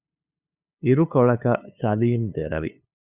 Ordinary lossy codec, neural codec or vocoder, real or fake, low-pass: Opus, 64 kbps; codec, 16 kHz, 2 kbps, FunCodec, trained on LibriTTS, 25 frames a second; fake; 3.6 kHz